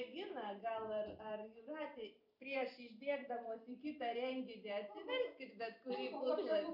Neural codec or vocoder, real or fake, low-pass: vocoder, 44.1 kHz, 128 mel bands every 512 samples, BigVGAN v2; fake; 5.4 kHz